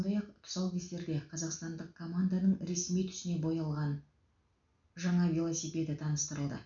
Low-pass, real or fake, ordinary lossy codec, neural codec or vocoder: 7.2 kHz; real; none; none